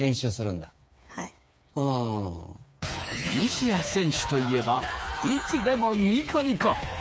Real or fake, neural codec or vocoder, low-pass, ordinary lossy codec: fake; codec, 16 kHz, 4 kbps, FreqCodec, smaller model; none; none